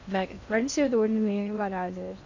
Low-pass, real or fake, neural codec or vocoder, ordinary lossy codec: 7.2 kHz; fake; codec, 16 kHz in and 24 kHz out, 0.6 kbps, FocalCodec, streaming, 2048 codes; MP3, 64 kbps